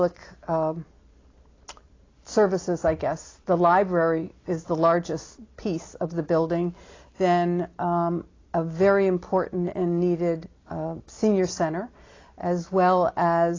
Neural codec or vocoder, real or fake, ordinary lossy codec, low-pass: none; real; AAC, 32 kbps; 7.2 kHz